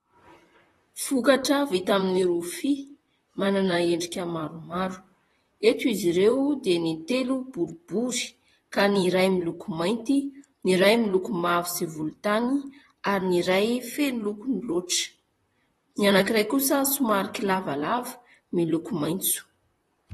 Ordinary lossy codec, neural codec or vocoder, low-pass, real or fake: AAC, 32 kbps; vocoder, 44.1 kHz, 128 mel bands, Pupu-Vocoder; 19.8 kHz; fake